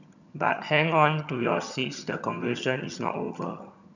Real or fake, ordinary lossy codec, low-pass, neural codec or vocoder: fake; none; 7.2 kHz; vocoder, 22.05 kHz, 80 mel bands, HiFi-GAN